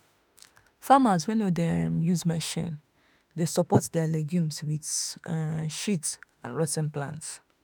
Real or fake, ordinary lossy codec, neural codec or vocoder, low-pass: fake; none; autoencoder, 48 kHz, 32 numbers a frame, DAC-VAE, trained on Japanese speech; none